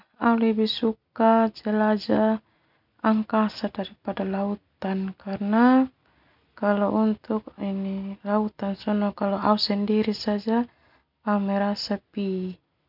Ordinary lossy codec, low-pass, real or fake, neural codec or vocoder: none; 5.4 kHz; real; none